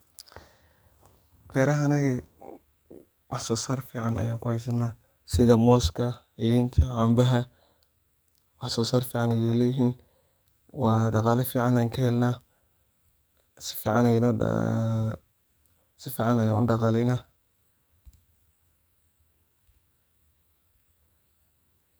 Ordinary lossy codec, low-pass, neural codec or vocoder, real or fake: none; none; codec, 44.1 kHz, 2.6 kbps, SNAC; fake